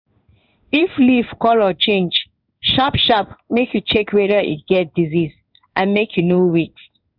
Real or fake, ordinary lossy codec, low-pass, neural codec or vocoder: fake; none; 5.4 kHz; codec, 16 kHz in and 24 kHz out, 1 kbps, XY-Tokenizer